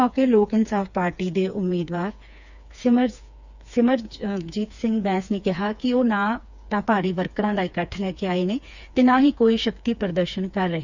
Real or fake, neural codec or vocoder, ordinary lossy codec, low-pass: fake; codec, 16 kHz, 4 kbps, FreqCodec, smaller model; none; 7.2 kHz